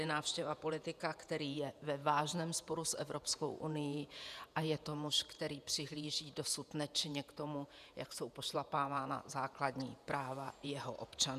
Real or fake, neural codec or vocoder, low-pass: fake; vocoder, 48 kHz, 128 mel bands, Vocos; 14.4 kHz